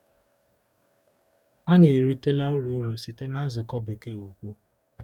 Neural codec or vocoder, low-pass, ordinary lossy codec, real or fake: codec, 44.1 kHz, 2.6 kbps, DAC; 19.8 kHz; none; fake